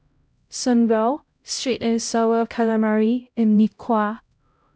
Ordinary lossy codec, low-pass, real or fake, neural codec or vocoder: none; none; fake; codec, 16 kHz, 0.5 kbps, X-Codec, HuBERT features, trained on LibriSpeech